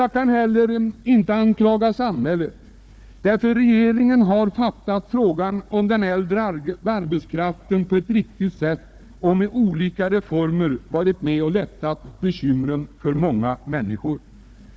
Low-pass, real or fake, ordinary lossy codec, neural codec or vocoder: none; fake; none; codec, 16 kHz, 4 kbps, FunCodec, trained on Chinese and English, 50 frames a second